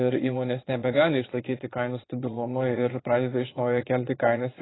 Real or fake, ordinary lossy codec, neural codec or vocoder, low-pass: fake; AAC, 16 kbps; vocoder, 22.05 kHz, 80 mel bands, Vocos; 7.2 kHz